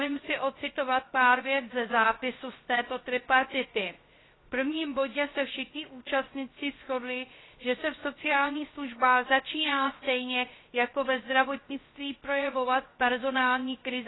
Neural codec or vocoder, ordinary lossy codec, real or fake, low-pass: codec, 16 kHz, 0.3 kbps, FocalCodec; AAC, 16 kbps; fake; 7.2 kHz